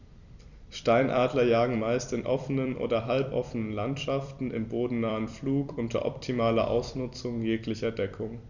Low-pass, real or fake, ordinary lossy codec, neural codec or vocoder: 7.2 kHz; fake; none; vocoder, 44.1 kHz, 128 mel bands every 256 samples, BigVGAN v2